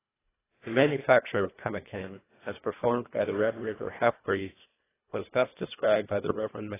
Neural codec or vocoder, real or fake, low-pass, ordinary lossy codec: codec, 24 kHz, 1.5 kbps, HILCodec; fake; 3.6 kHz; AAC, 16 kbps